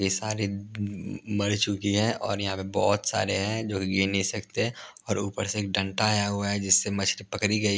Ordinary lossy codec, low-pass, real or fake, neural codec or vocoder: none; none; real; none